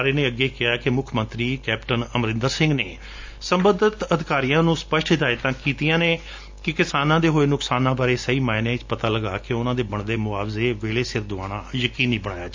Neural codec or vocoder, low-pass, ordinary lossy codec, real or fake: none; 7.2 kHz; MP3, 64 kbps; real